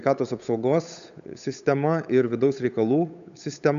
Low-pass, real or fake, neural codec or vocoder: 7.2 kHz; fake; codec, 16 kHz, 8 kbps, FunCodec, trained on Chinese and English, 25 frames a second